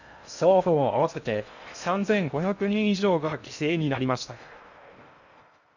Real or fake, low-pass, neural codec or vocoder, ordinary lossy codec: fake; 7.2 kHz; codec, 16 kHz in and 24 kHz out, 0.6 kbps, FocalCodec, streaming, 2048 codes; none